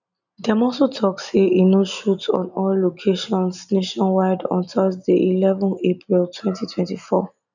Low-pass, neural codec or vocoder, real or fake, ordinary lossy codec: 7.2 kHz; none; real; none